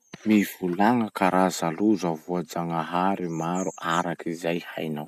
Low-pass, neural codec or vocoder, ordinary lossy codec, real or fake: 14.4 kHz; none; none; real